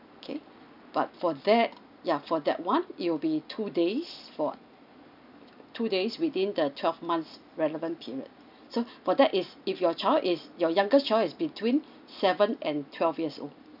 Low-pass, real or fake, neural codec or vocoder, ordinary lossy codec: 5.4 kHz; real; none; none